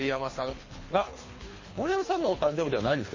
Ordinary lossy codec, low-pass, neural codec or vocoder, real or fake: MP3, 32 kbps; 7.2 kHz; codec, 24 kHz, 3 kbps, HILCodec; fake